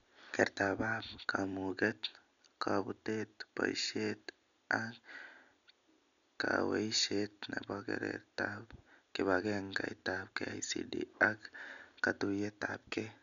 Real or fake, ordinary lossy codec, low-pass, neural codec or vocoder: real; none; 7.2 kHz; none